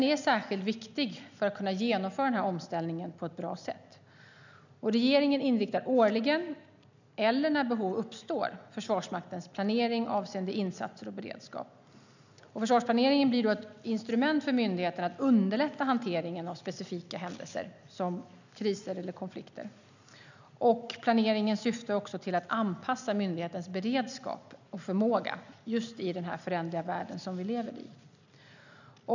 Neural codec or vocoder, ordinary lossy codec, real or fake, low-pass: none; none; real; 7.2 kHz